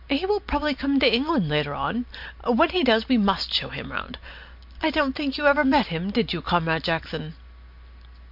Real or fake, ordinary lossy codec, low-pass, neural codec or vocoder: real; MP3, 48 kbps; 5.4 kHz; none